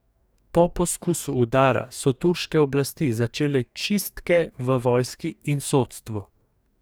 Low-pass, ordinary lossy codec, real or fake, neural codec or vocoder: none; none; fake; codec, 44.1 kHz, 2.6 kbps, DAC